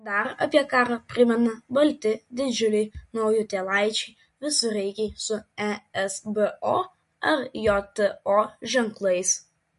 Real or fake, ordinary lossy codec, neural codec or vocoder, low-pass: real; MP3, 48 kbps; none; 14.4 kHz